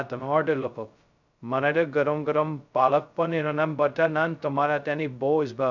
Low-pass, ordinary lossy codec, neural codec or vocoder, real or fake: 7.2 kHz; none; codec, 16 kHz, 0.2 kbps, FocalCodec; fake